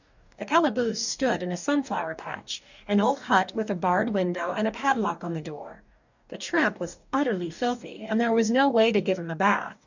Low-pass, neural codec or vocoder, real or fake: 7.2 kHz; codec, 44.1 kHz, 2.6 kbps, DAC; fake